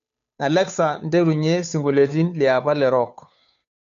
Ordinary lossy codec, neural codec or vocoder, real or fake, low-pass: none; codec, 16 kHz, 2 kbps, FunCodec, trained on Chinese and English, 25 frames a second; fake; 7.2 kHz